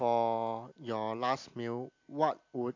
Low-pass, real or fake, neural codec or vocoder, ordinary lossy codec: 7.2 kHz; real; none; MP3, 48 kbps